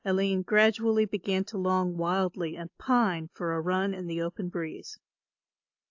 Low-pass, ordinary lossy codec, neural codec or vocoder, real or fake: 7.2 kHz; AAC, 48 kbps; none; real